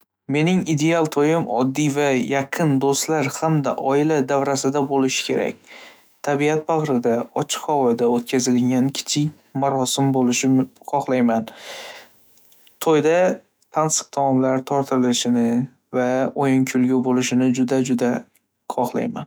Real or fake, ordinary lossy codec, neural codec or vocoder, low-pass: fake; none; autoencoder, 48 kHz, 128 numbers a frame, DAC-VAE, trained on Japanese speech; none